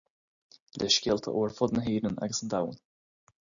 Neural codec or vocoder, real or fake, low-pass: none; real; 7.2 kHz